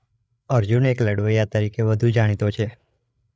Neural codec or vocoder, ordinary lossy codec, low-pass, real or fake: codec, 16 kHz, 8 kbps, FreqCodec, larger model; none; none; fake